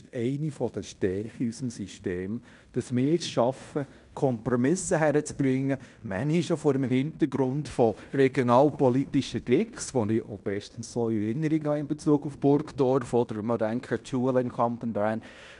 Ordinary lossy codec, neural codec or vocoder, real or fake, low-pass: none; codec, 16 kHz in and 24 kHz out, 0.9 kbps, LongCat-Audio-Codec, fine tuned four codebook decoder; fake; 10.8 kHz